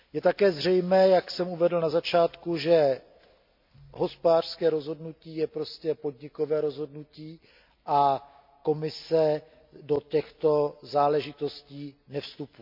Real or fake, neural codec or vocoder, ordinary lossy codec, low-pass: real; none; MP3, 48 kbps; 5.4 kHz